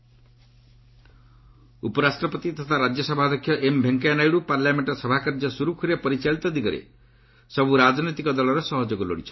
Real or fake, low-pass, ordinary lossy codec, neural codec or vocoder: real; 7.2 kHz; MP3, 24 kbps; none